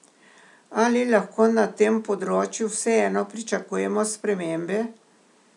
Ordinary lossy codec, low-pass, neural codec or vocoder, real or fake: none; 10.8 kHz; none; real